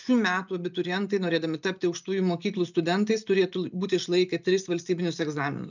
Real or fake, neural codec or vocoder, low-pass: real; none; 7.2 kHz